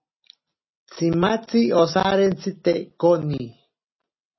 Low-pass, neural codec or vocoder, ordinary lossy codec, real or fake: 7.2 kHz; none; MP3, 24 kbps; real